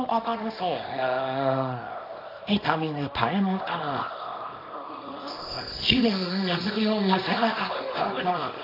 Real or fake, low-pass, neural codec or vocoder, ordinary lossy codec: fake; 5.4 kHz; codec, 24 kHz, 0.9 kbps, WavTokenizer, small release; AAC, 32 kbps